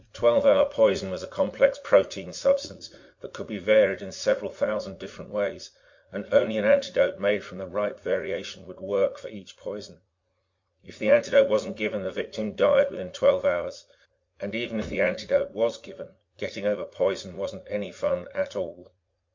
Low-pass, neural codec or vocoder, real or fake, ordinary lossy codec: 7.2 kHz; vocoder, 44.1 kHz, 80 mel bands, Vocos; fake; MP3, 48 kbps